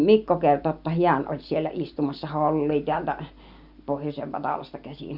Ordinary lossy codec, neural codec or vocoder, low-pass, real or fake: Opus, 64 kbps; none; 5.4 kHz; real